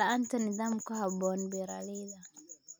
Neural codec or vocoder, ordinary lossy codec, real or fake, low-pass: none; none; real; none